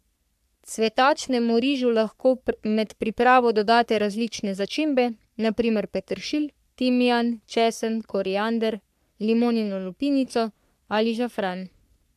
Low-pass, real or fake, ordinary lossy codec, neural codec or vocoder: 14.4 kHz; fake; AAC, 96 kbps; codec, 44.1 kHz, 3.4 kbps, Pupu-Codec